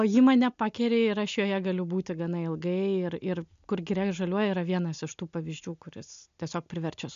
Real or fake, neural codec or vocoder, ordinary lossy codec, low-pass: real; none; MP3, 64 kbps; 7.2 kHz